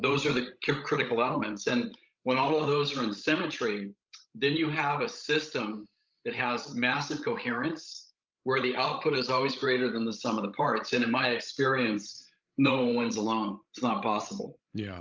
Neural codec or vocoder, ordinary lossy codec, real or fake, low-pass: codec, 16 kHz, 16 kbps, FreqCodec, larger model; Opus, 24 kbps; fake; 7.2 kHz